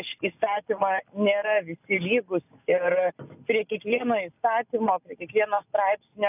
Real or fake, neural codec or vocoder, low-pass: real; none; 3.6 kHz